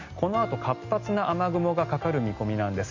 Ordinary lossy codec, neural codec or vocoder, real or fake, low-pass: MP3, 48 kbps; none; real; 7.2 kHz